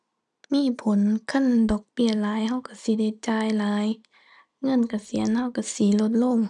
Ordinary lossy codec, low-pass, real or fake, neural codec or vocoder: none; 10.8 kHz; real; none